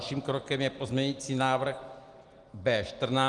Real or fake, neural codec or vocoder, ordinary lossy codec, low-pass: real; none; Opus, 24 kbps; 10.8 kHz